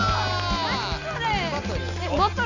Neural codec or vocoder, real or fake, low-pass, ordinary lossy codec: none; real; 7.2 kHz; none